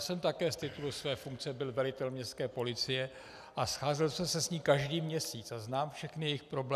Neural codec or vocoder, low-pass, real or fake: none; 14.4 kHz; real